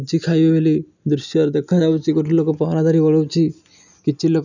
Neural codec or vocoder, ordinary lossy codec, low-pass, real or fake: none; none; 7.2 kHz; real